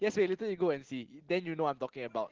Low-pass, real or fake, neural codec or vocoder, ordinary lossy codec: 7.2 kHz; real; none; Opus, 16 kbps